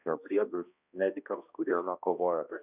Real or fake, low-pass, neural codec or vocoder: fake; 3.6 kHz; codec, 16 kHz, 1 kbps, X-Codec, HuBERT features, trained on balanced general audio